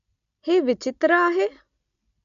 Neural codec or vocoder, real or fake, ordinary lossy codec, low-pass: none; real; none; 7.2 kHz